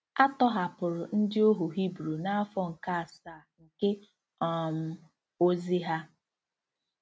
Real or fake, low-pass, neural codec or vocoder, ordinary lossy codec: real; none; none; none